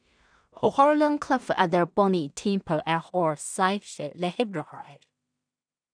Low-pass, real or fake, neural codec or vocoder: 9.9 kHz; fake; codec, 16 kHz in and 24 kHz out, 0.4 kbps, LongCat-Audio-Codec, two codebook decoder